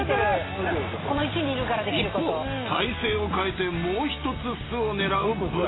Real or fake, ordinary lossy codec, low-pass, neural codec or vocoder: real; AAC, 16 kbps; 7.2 kHz; none